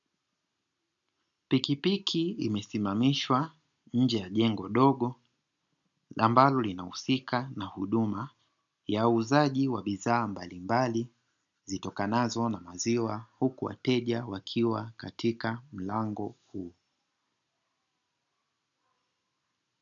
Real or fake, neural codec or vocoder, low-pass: real; none; 7.2 kHz